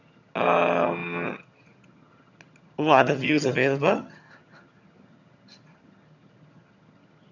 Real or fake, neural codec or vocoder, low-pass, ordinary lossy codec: fake; vocoder, 22.05 kHz, 80 mel bands, HiFi-GAN; 7.2 kHz; none